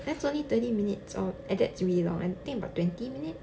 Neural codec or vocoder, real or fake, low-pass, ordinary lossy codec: none; real; none; none